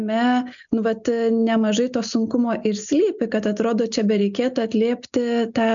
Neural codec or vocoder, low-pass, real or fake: none; 7.2 kHz; real